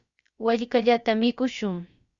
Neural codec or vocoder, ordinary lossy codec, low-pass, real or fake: codec, 16 kHz, about 1 kbps, DyCAST, with the encoder's durations; Opus, 64 kbps; 7.2 kHz; fake